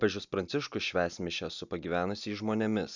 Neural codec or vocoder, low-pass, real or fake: none; 7.2 kHz; real